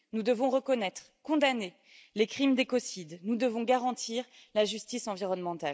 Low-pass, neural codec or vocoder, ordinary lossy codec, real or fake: none; none; none; real